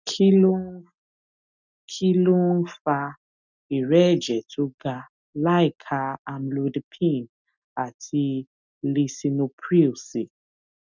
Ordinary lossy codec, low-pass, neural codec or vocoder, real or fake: none; 7.2 kHz; none; real